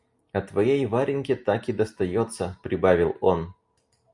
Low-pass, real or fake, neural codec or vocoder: 10.8 kHz; real; none